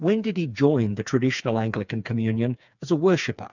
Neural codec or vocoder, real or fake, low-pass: codec, 16 kHz, 4 kbps, FreqCodec, smaller model; fake; 7.2 kHz